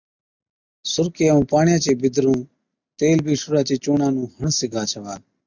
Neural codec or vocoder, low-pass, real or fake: none; 7.2 kHz; real